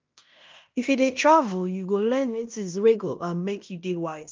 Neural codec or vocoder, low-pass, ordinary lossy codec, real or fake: codec, 16 kHz in and 24 kHz out, 0.9 kbps, LongCat-Audio-Codec, fine tuned four codebook decoder; 7.2 kHz; Opus, 32 kbps; fake